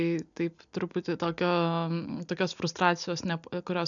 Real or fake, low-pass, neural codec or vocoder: real; 7.2 kHz; none